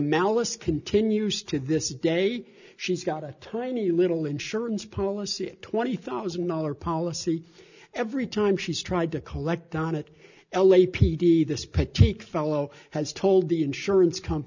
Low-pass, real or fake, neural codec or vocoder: 7.2 kHz; real; none